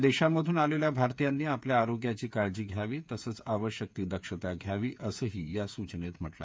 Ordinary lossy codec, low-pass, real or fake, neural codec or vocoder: none; none; fake; codec, 16 kHz, 8 kbps, FreqCodec, smaller model